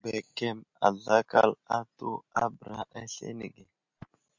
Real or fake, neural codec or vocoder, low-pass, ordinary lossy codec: real; none; 7.2 kHz; MP3, 64 kbps